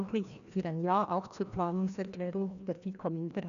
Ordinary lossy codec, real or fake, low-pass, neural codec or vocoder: none; fake; 7.2 kHz; codec, 16 kHz, 1 kbps, FreqCodec, larger model